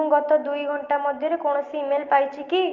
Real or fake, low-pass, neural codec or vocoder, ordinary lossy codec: real; 7.2 kHz; none; Opus, 24 kbps